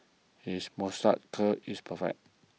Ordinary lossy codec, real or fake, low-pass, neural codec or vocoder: none; real; none; none